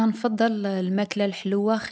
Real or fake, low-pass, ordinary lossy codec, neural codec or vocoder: real; none; none; none